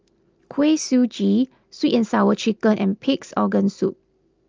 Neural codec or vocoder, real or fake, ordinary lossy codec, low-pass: none; real; Opus, 24 kbps; 7.2 kHz